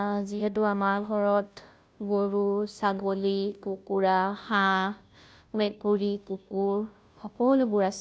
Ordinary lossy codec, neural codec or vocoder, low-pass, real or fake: none; codec, 16 kHz, 0.5 kbps, FunCodec, trained on Chinese and English, 25 frames a second; none; fake